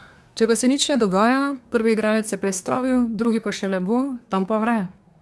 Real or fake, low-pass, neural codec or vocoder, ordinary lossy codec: fake; none; codec, 24 kHz, 1 kbps, SNAC; none